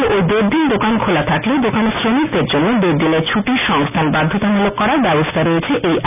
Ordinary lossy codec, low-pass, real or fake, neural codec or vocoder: none; 3.6 kHz; real; none